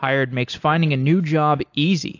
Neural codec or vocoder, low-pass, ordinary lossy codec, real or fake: none; 7.2 kHz; AAC, 48 kbps; real